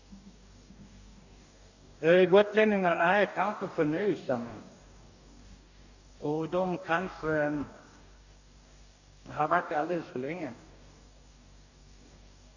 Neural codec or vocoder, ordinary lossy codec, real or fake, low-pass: codec, 44.1 kHz, 2.6 kbps, DAC; none; fake; 7.2 kHz